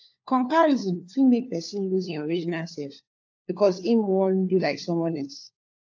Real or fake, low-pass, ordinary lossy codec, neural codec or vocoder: fake; 7.2 kHz; AAC, 48 kbps; codec, 16 kHz, 4 kbps, FunCodec, trained on LibriTTS, 50 frames a second